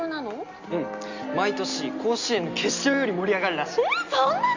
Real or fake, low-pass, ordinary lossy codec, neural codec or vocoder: real; 7.2 kHz; Opus, 64 kbps; none